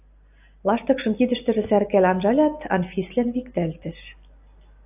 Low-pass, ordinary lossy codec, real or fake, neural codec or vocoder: 3.6 kHz; AAC, 32 kbps; real; none